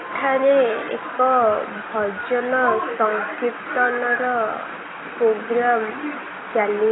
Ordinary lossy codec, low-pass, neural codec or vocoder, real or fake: AAC, 16 kbps; 7.2 kHz; none; real